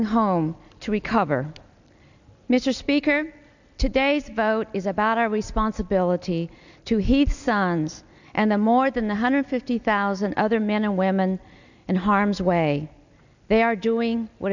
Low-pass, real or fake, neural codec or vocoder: 7.2 kHz; real; none